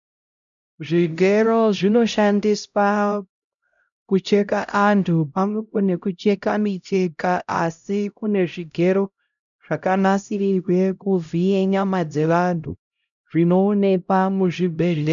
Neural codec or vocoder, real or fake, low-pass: codec, 16 kHz, 0.5 kbps, X-Codec, HuBERT features, trained on LibriSpeech; fake; 7.2 kHz